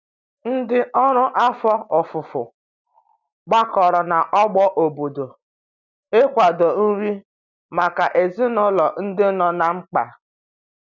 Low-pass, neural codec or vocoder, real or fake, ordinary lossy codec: 7.2 kHz; none; real; none